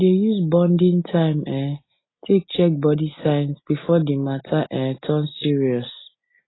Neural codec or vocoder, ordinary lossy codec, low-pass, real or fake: none; AAC, 16 kbps; 7.2 kHz; real